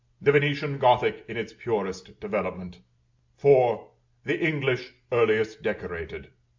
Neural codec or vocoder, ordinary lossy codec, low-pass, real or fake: none; MP3, 64 kbps; 7.2 kHz; real